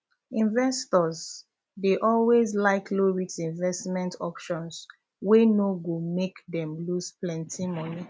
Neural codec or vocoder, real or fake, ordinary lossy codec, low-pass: none; real; none; none